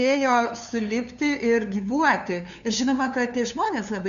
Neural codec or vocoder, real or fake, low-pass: codec, 16 kHz, 2 kbps, FunCodec, trained on LibriTTS, 25 frames a second; fake; 7.2 kHz